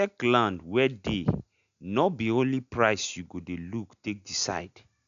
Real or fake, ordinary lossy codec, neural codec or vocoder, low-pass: real; none; none; 7.2 kHz